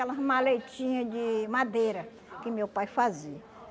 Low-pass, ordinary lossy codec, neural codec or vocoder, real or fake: none; none; none; real